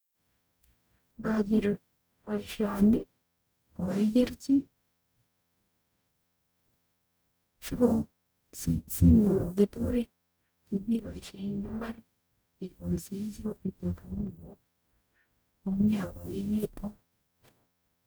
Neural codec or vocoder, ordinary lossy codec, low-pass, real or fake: codec, 44.1 kHz, 0.9 kbps, DAC; none; none; fake